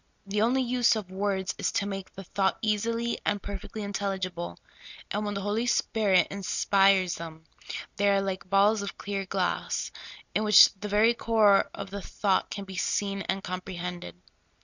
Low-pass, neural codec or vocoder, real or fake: 7.2 kHz; none; real